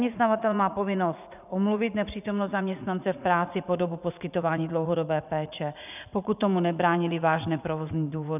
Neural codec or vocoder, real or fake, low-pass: vocoder, 44.1 kHz, 80 mel bands, Vocos; fake; 3.6 kHz